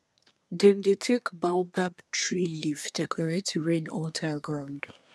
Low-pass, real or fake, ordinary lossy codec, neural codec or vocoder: none; fake; none; codec, 24 kHz, 1 kbps, SNAC